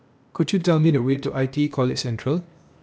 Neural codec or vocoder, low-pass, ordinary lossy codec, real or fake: codec, 16 kHz, 0.8 kbps, ZipCodec; none; none; fake